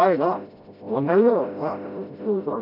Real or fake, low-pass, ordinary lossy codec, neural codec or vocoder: fake; 5.4 kHz; none; codec, 16 kHz, 0.5 kbps, FreqCodec, smaller model